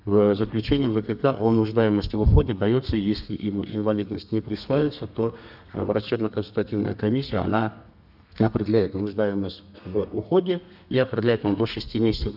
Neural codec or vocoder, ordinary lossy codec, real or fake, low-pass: codec, 32 kHz, 1.9 kbps, SNAC; none; fake; 5.4 kHz